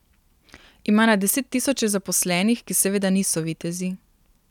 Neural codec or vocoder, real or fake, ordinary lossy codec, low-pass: none; real; none; 19.8 kHz